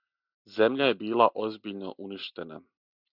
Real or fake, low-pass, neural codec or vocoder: real; 5.4 kHz; none